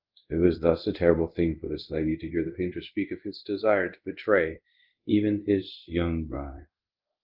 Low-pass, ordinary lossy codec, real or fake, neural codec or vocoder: 5.4 kHz; Opus, 32 kbps; fake; codec, 24 kHz, 0.5 kbps, DualCodec